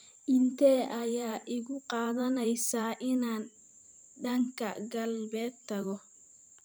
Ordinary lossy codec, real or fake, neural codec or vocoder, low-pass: none; fake; vocoder, 44.1 kHz, 128 mel bands every 512 samples, BigVGAN v2; none